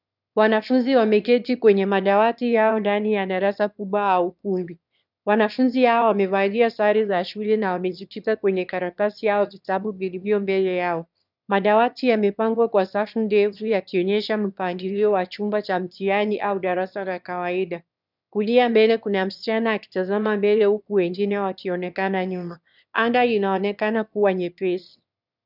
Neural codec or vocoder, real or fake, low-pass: autoencoder, 22.05 kHz, a latent of 192 numbers a frame, VITS, trained on one speaker; fake; 5.4 kHz